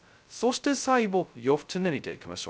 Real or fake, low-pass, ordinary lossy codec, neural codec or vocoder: fake; none; none; codec, 16 kHz, 0.2 kbps, FocalCodec